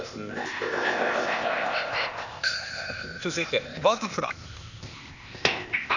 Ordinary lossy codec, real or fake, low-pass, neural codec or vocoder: none; fake; 7.2 kHz; codec, 16 kHz, 0.8 kbps, ZipCodec